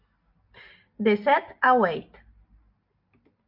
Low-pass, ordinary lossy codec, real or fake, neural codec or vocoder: 5.4 kHz; AAC, 48 kbps; real; none